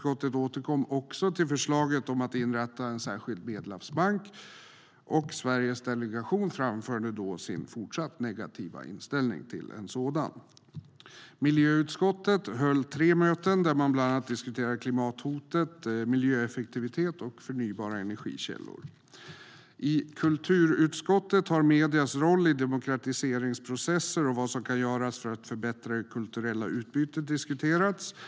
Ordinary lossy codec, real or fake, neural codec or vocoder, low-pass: none; real; none; none